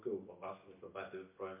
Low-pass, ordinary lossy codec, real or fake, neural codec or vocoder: 3.6 kHz; MP3, 24 kbps; real; none